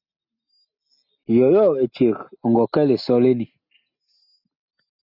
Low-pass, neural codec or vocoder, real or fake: 5.4 kHz; none; real